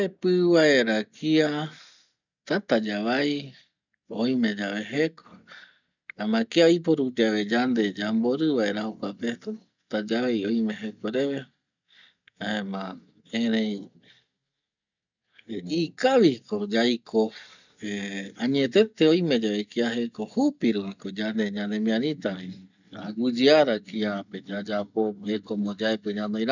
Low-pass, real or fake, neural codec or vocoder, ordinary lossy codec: 7.2 kHz; real; none; none